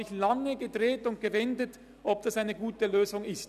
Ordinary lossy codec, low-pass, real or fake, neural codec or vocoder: none; 14.4 kHz; real; none